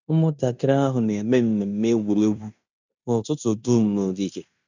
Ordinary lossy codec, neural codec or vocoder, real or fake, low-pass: none; codec, 16 kHz in and 24 kHz out, 0.9 kbps, LongCat-Audio-Codec, fine tuned four codebook decoder; fake; 7.2 kHz